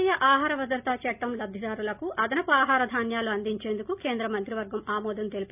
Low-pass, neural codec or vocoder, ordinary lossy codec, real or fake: 3.6 kHz; none; none; real